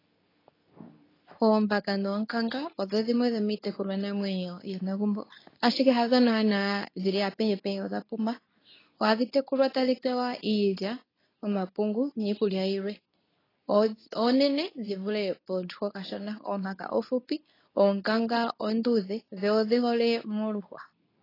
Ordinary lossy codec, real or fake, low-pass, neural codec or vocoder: AAC, 24 kbps; fake; 5.4 kHz; codec, 24 kHz, 0.9 kbps, WavTokenizer, medium speech release version 2